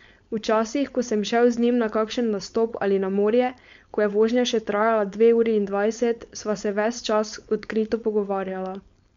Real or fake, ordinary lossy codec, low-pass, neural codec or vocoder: fake; MP3, 64 kbps; 7.2 kHz; codec, 16 kHz, 4.8 kbps, FACodec